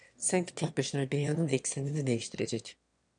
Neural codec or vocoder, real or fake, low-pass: autoencoder, 22.05 kHz, a latent of 192 numbers a frame, VITS, trained on one speaker; fake; 9.9 kHz